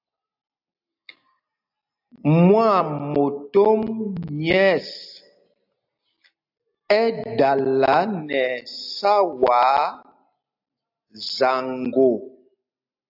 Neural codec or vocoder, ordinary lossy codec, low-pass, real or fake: none; AAC, 48 kbps; 5.4 kHz; real